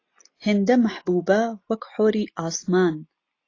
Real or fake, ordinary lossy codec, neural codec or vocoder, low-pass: real; AAC, 32 kbps; none; 7.2 kHz